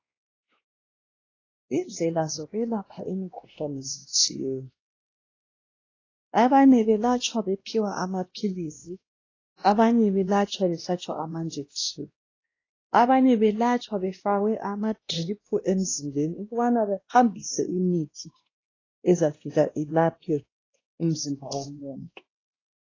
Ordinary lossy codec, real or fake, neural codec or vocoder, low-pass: AAC, 32 kbps; fake; codec, 16 kHz, 1 kbps, X-Codec, WavLM features, trained on Multilingual LibriSpeech; 7.2 kHz